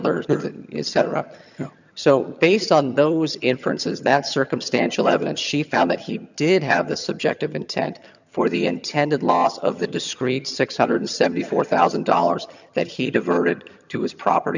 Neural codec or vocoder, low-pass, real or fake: vocoder, 22.05 kHz, 80 mel bands, HiFi-GAN; 7.2 kHz; fake